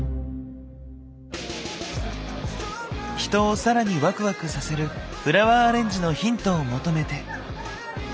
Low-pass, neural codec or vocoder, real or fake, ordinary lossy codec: none; none; real; none